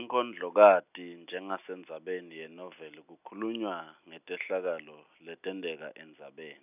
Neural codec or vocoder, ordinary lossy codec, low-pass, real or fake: none; none; 3.6 kHz; real